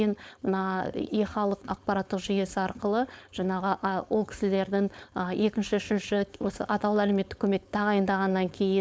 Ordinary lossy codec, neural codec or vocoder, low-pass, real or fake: none; codec, 16 kHz, 4.8 kbps, FACodec; none; fake